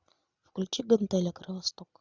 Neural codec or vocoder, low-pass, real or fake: none; 7.2 kHz; real